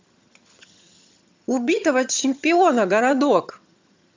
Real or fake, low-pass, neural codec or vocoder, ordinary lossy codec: fake; 7.2 kHz; vocoder, 22.05 kHz, 80 mel bands, HiFi-GAN; MP3, 64 kbps